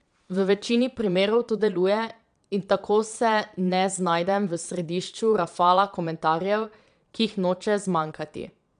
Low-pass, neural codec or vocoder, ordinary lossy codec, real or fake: 9.9 kHz; vocoder, 22.05 kHz, 80 mel bands, Vocos; none; fake